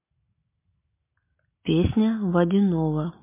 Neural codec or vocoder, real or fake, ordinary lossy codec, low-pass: none; real; MP3, 24 kbps; 3.6 kHz